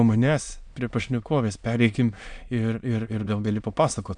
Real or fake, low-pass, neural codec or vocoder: fake; 9.9 kHz; autoencoder, 22.05 kHz, a latent of 192 numbers a frame, VITS, trained on many speakers